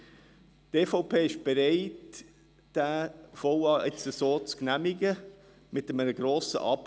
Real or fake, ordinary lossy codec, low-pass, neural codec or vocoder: real; none; none; none